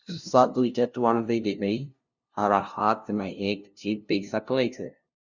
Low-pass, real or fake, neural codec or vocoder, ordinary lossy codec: 7.2 kHz; fake; codec, 16 kHz, 0.5 kbps, FunCodec, trained on LibriTTS, 25 frames a second; Opus, 64 kbps